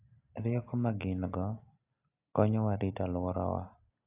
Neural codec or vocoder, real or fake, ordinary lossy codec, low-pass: none; real; AAC, 32 kbps; 3.6 kHz